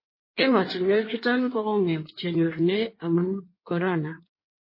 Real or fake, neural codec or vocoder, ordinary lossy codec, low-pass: fake; codec, 16 kHz in and 24 kHz out, 1.1 kbps, FireRedTTS-2 codec; MP3, 24 kbps; 5.4 kHz